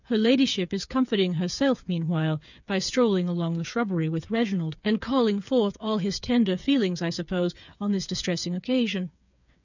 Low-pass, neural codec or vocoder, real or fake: 7.2 kHz; codec, 16 kHz, 8 kbps, FreqCodec, smaller model; fake